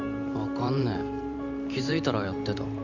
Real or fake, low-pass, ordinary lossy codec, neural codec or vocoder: fake; 7.2 kHz; none; vocoder, 44.1 kHz, 128 mel bands every 512 samples, BigVGAN v2